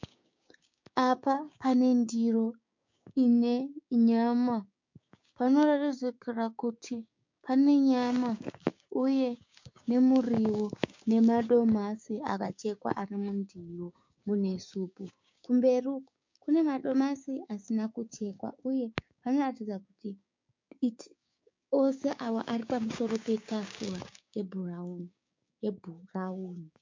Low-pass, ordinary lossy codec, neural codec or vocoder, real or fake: 7.2 kHz; MP3, 48 kbps; codec, 16 kHz, 6 kbps, DAC; fake